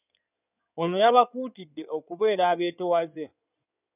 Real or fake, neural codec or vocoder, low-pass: fake; codec, 16 kHz in and 24 kHz out, 2.2 kbps, FireRedTTS-2 codec; 3.6 kHz